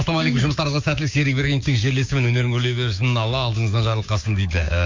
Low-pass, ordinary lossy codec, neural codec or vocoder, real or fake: 7.2 kHz; MP3, 48 kbps; codec, 16 kHz, 6 kbps, DAC; fake